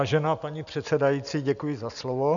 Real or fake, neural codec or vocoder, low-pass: real; none; 7.2 kHz